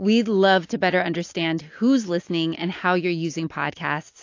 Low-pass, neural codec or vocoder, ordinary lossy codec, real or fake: 7.2 kHz; none; AAC, 48 kbps; real